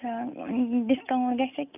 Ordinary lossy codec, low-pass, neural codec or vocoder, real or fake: none; 3.6 kHz; none; real